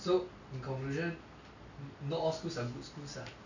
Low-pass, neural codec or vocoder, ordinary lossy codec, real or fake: 7.2 kHz; none; none; real